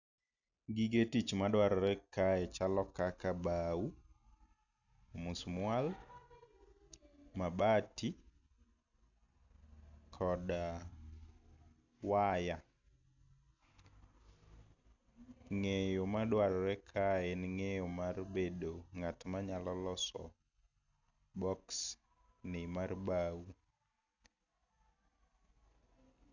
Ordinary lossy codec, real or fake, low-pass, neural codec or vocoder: none; real; 7.2 kHz; none